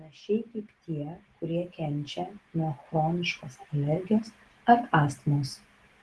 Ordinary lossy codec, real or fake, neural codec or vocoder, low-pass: Opus, 16 kbps; real; none; 10.8 kHz